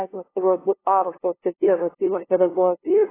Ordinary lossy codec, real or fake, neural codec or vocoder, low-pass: AAC, 16 kbps; fake; codec, 16 kHz, 0.5 kbps, FunCodec, trained on LibriTTS, 25 frames a second; 3.6 kHz